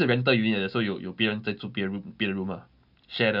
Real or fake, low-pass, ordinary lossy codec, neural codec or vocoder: real; 5.4 kHz; none; none